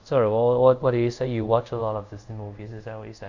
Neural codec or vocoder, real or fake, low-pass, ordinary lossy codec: codec, 24 kHz, 0.5 kbps, DualCodec; fake; 7.2 kHz; Opus, 64 kbps